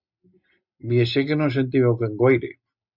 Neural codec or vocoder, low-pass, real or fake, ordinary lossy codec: none; 5.4 kHz; real; Opus, 64 kbps